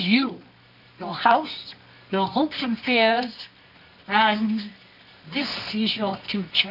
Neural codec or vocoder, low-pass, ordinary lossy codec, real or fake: codec, 24 kHz, 0.9 kbps, WavTokenizer, medium music audio release; 5.4 kHz; AAC, 48 kbps; fake